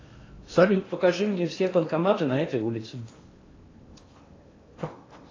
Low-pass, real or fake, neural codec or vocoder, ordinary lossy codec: 7.2 kHz; fake; codec, 16 kHz in and 24 kHz out, 0.8 kbps, FocalCodec, streaming, 65536 codes; AAC, 32 kbps